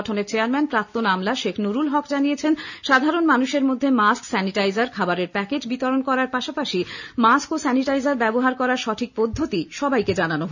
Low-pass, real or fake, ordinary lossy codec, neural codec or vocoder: 7.2 kHz; real; none; none